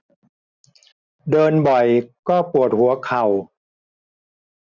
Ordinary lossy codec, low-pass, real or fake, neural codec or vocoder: none; 7.2 kHz; real; none